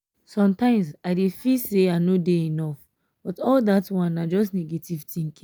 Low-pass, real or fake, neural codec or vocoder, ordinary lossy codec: none; real; none; none